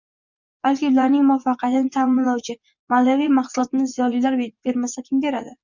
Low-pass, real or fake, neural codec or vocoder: 7.2 kHz; fake; vocoder, 24 kHz, 100 mel bands, Vocos